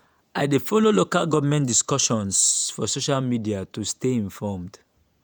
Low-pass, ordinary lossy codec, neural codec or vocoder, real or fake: none; none; vocoder, 48 kHz, 128 mel bands, Vocos; fake